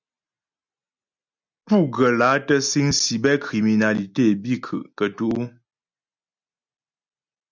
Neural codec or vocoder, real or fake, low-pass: none; real; 7.2 kHz